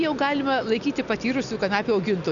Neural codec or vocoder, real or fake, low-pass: none; real; 7.2 kHz